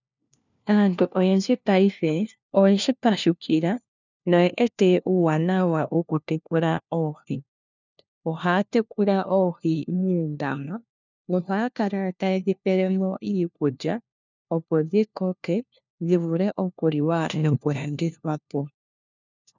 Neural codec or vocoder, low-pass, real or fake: codec, 16 kHz, 1 kbps, FunCodec, trained on LibriTTS, 50 frames a second; 7.2 kHz; fake